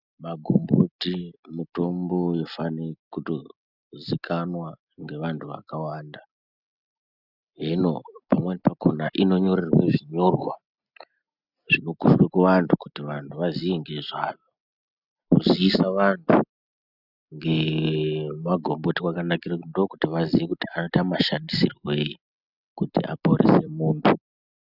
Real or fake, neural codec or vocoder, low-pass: real; none; 5.4 kHz